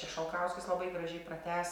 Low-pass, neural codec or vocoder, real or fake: 19.8 kHz; none; real